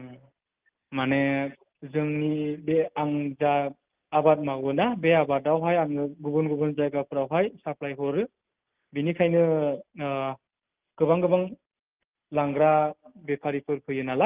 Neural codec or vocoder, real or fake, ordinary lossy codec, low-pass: none; real; Opus, 32 kbps; 3.6 kHz